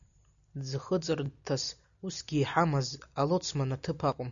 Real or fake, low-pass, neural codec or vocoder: real; 7.2 kHz; none